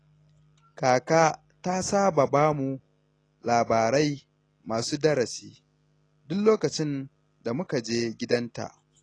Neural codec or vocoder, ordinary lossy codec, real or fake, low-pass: none; AAC, 32 kbps; real; 9.9 kHz